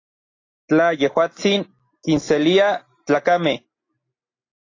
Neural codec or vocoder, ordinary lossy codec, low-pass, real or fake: none; AAC, 32 kbps; 7.2 kHz; real